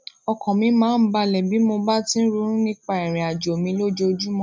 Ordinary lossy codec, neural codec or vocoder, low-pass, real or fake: none; none; none; real